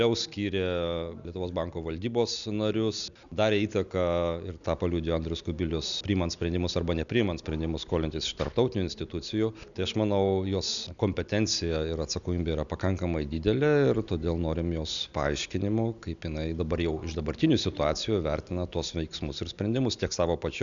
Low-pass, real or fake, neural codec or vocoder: 7.2 kHz; real; none